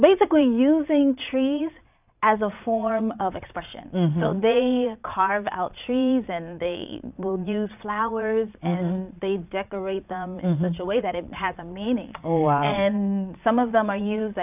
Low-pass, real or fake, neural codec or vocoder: 3.6 kHz; fake; vocoder, 22.05 kHz, 80 mel bands, Vocos